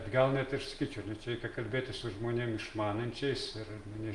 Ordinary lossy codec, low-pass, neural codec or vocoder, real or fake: Opus, 24 kbps; 10.8 kHz; none; real